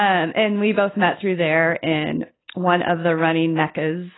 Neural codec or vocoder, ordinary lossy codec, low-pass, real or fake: none; AAC, 16 kbps; 7.2 kHz; real